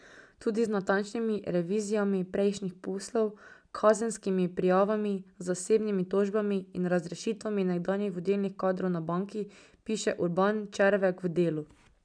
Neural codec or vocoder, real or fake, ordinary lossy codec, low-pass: none; real; none; 9.9 kHz